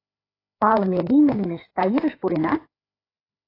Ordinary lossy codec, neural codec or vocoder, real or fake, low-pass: AAC, 24 kbps; codec, 16 kHz, 4 kbps, FreqCodec, larger model; fake; 5.4 kHz